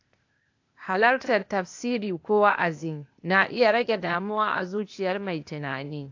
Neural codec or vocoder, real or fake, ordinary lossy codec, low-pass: codec, 16 kHz, 0.8 kbps, ZipCodec; fake; AAC, 48 kbps; 7.2 kHz